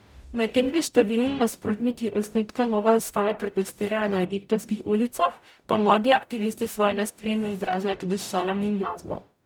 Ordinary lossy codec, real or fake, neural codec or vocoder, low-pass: none; fake; codec, 44.1 kHz, 0.9 kbps, DAC; none